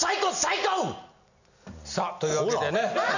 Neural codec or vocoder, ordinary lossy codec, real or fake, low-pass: none; none; real; 7.2 kHz